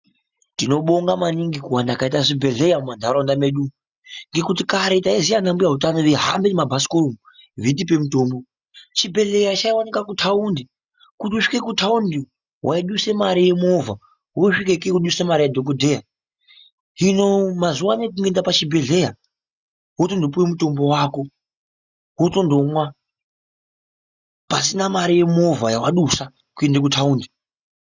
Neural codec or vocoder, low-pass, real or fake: none; 7.2 kHz; real